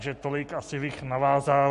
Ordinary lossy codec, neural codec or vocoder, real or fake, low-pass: MP3, 48 kbps; none; real; 14.4 kHz